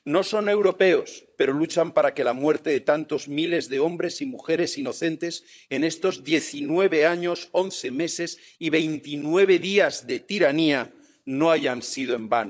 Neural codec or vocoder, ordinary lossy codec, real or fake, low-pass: codec, 16 kHz, 16 kbps, FunCodec, trained on LibriTTS, 50 frames a second; none; fake; none